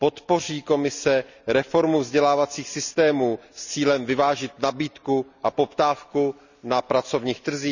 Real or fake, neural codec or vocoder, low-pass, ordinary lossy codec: real; none; 7.2 kHz; none